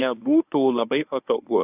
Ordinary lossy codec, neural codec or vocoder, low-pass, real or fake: AAC, 32 kbps; codec, 24 kHz, 0.9 kbps, WavTokenizer, medium speech release version 1; 3.6 kHz; fake